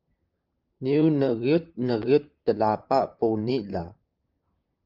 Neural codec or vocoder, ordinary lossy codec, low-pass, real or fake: vocoder, 44.1 kHz, 128 mel bands, Pupu-Vocoder; Opus, 24 kbps; 5.4 kHz; fake